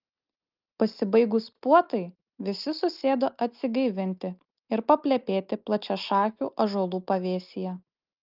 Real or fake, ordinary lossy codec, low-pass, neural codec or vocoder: real; Opus, 32 kbps; 5.4 kHz; none